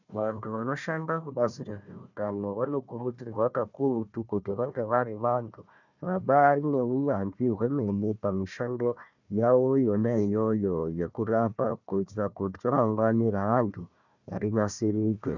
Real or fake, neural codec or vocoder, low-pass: fake; codec, 16 kHz, 1 kbps, FunCodec, trained on Chinese and English, 50 frames a second; 7.2 kHz